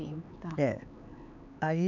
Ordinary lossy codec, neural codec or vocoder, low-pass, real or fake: none; codec, 16 kHz, 4 kbps, X-Codec, HuBERT features, trained on LibriSpeech; 7.2 kHz; fake